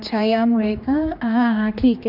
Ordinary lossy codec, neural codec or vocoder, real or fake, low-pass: none; codec, 16 kHz, 2 kbps, X-Codec, HuBERT features, trained on general audio; fake; 5.4 kHz